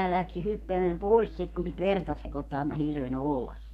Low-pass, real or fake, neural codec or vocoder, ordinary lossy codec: 14.4 kHz; fake; codec, 32 kHz, 1.9 kbps, SNAC; none